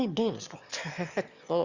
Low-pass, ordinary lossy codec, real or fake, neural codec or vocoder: 7.2 kHz; Opus, 64 kbps; fake; autoencoder, 22.05 kHz, a latent of 192 numbers a frame, VITS, trained on one speaker